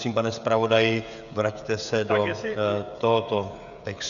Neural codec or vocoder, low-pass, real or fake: codec, 16 kHz, 16 kbps, FreqCodec, smaller model; 7.2 kHz; fake